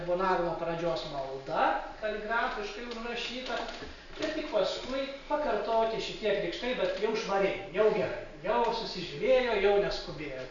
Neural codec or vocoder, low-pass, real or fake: none; 7.2 kHz; real